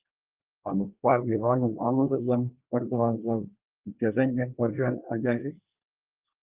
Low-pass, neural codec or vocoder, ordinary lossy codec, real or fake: 3.6 kHz; codec, 24 kHz, 1 kbps, SNAC; Opus, 24 kbps; fake